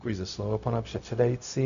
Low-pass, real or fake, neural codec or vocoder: 7.2 kHz; fake; codec, 16 kHz, 0.4 kbps, LongCat-Audio-Codec